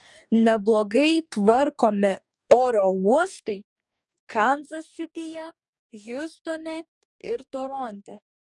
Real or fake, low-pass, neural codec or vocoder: fake; 10.8 kHz; codec, 44.1 kHz, 2.6 kbps, DAC